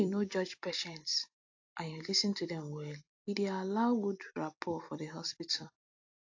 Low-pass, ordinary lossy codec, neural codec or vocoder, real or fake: 7.2 kHz; AAC, 48 kbps; vocoder, 44.1 kHz, 128 mel bands every 256 samples, BigVGAN v2; fake